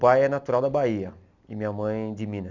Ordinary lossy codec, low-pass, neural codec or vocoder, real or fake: none; 7.2 kHz; none; real